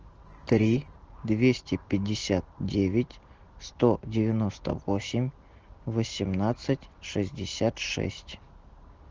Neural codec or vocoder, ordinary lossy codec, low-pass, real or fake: none; Opus, 16 kbps; 7.2 kHz; real